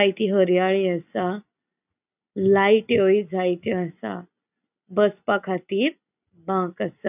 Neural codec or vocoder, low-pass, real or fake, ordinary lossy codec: none; 3.6 kHz; real; none